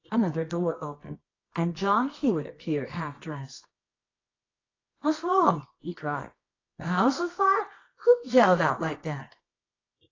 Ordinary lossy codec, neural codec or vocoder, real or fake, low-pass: AAC, 32 kbps; codec, 24 kHz, 0.9 kbps, WavTokenizer, medium music audio release; fake; 7.2 kHz